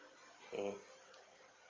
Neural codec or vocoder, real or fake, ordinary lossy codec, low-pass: none; real; Opus, 32 kbps; 7.2 kHz